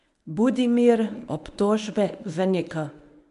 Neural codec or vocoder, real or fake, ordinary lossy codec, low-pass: codec, 24 kHz, 0.9 kbps, WavTokenizer, medium speech release version 1; fake; none; 10.8 kHz